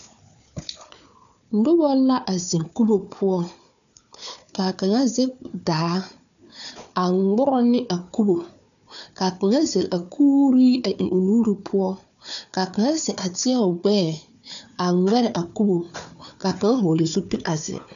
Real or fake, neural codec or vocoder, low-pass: fake; codec, 16 kHz, 4 kbps, FunCodec, trained on Chinese and English, 50 frames a second; 7.2 kHz